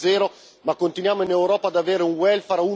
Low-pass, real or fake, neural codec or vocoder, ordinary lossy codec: none; real; none; none